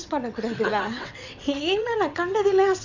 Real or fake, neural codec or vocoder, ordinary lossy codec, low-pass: fake; vocoder, 22.05 kHz, 80 mel bands, WaveNeXt; none; 7.2 kHz